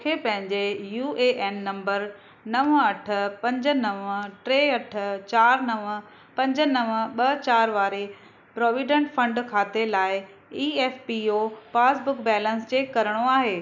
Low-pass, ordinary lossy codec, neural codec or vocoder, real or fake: 7.2 kHz; none; none; real